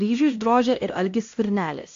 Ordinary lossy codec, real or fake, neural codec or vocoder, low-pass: AAC, 48 kbps; fake; codec, 16 kHz, 1 kbps, X-Codec, HuBERT features, trained on LibriSpeech; 7.2 kHz